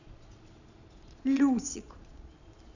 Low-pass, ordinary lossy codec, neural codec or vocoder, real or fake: 7.2 kHz; none; vocoder, 22.05 kHz, 80 mel bands, Vocos; fake